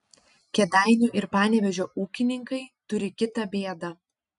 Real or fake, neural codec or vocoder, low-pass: real; none; 10.8 kHz